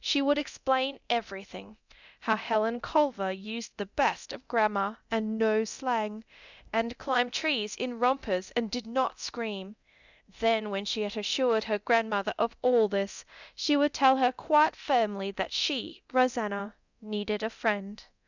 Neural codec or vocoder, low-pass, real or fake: codec, 24 kHz, 0.9 kbps, DualCodec; 7.2 kHz; fake